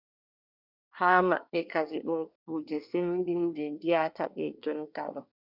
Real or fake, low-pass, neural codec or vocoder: fake; 5.4 kHz; codec, 24 kHz, 1 kbps, SNAC